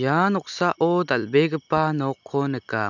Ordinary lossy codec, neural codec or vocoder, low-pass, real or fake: none; none; 7.2 kHz; real